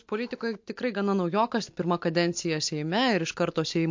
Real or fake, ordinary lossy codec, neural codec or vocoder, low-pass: real; MP3, 48 kbps; none; 7.2 kHz